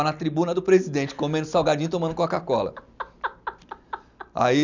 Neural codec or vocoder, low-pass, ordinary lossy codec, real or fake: none; 7.2 kHz; none; real